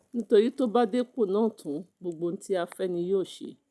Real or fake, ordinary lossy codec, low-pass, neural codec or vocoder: real; none; none; none